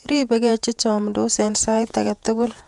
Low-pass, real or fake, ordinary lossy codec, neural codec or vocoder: 10.8 kHz; fake; none; vocoder, 48 kHz, 128 mel bands, Vocos